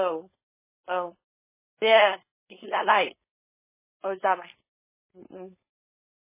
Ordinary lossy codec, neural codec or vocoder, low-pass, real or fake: MP3, 16 kbps; codec, 16 kHz, 4.8 kbps, FACodec; 3.6 kHz; fake